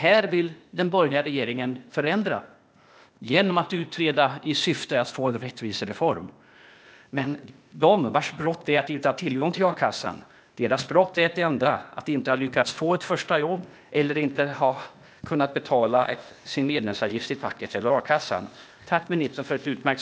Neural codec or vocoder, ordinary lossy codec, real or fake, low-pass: codec, 16 kHz, 0.8 kbps, ZipCodec; none; fake; none